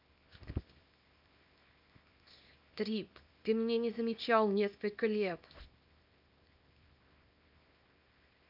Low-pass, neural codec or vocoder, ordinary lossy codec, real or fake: 5.4 kHz; codec, 24 kHz, 0.9 kbps, WavTokenizer, small release; none; fake